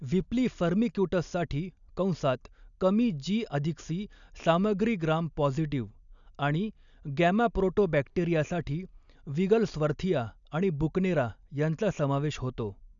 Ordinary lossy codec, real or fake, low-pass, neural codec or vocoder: none; real; 7.2 kHz; none